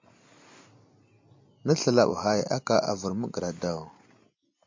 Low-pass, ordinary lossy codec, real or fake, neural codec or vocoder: 7.2 kHz; MP3, 48 kbps; real; none